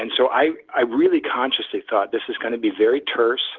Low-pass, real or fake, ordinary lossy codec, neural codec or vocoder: 7.2 kHz; real; Opus, 16 kbps; none